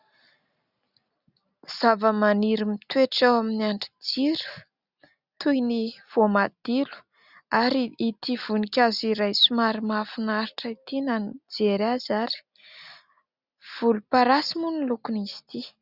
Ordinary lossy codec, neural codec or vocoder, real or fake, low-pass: Opus, 64 kbps; none; real; 5.4 kHz